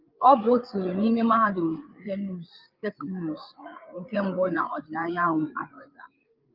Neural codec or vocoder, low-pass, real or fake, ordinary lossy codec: codec, 16 kHz, 8 kbps, FreqCodec, larger model; 5.4 kHz; fake; Opus, 32 kbps